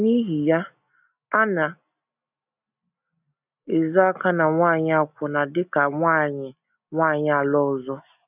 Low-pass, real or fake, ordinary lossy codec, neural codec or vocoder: 3.6 kHz; real; none; none